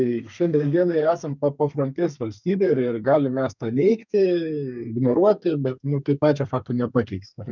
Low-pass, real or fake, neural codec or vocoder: 7.2 kHz; fake; codec, 32 kHz, 1.9 kbps, SNAC